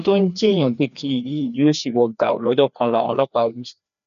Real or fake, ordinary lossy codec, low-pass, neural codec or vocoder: fake; none; 7.2 kHz; codec, 16 kHz, 2 kbps, FreqCodec, larger model